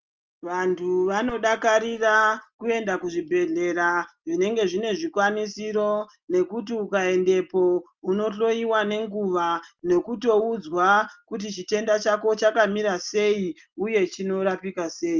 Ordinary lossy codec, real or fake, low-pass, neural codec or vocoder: Opus, 24 kbps; real; 7.2 kHz; none